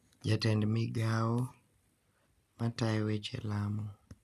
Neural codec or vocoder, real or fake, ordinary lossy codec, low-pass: none; real; none; 14.4 kHz